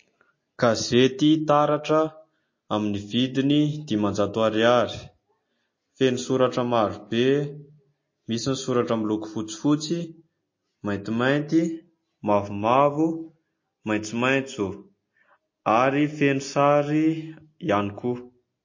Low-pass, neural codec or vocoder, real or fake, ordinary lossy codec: 7.2 kHz; none; real; MP3, 32 kbps